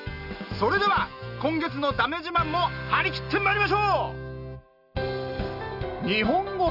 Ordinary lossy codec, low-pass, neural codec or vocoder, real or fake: none; 5.4 kHz; none; real